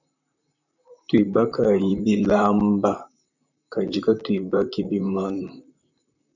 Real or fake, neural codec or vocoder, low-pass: fake; vocoder, 44.1 kHz, 128 mel bands, Pupu-Vocoder; 7.2 kHz